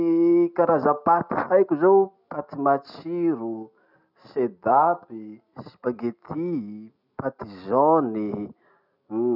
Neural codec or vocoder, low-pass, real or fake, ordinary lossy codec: none; 5.4 kHz; real; none